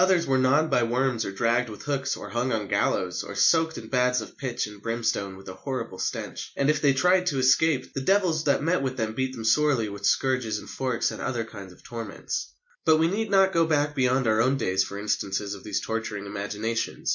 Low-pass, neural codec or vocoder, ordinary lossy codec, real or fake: 7.2 kHz; none; MP3, 48 kbps; real